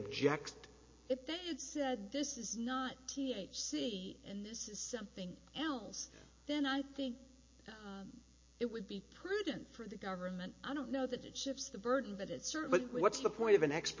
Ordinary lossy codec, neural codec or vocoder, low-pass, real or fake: MP3, 32 kbps; none; 7.2 kHz; real